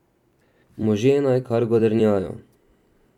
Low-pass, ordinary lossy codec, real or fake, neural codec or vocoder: 19.8 kHz; none; fake; vocoder, 44.1 kHz, 128 mel bands every 256 samples, BigVGAN v2